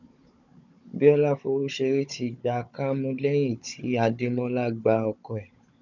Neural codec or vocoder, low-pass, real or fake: codec, 16 kHz, 16 kbps, FunCodec, trained on Chinese and English, 50 frames a second; 7.2 kHz; fake